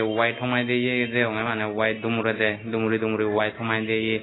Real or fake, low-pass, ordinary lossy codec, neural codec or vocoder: real; 7.2 kHz; AAC, 16 kbps; none